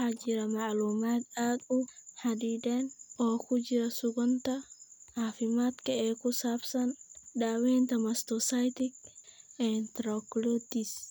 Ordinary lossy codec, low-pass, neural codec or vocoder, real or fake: none; none; none; real